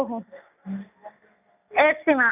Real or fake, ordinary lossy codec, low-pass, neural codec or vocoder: real; none; 3.6 kHz; none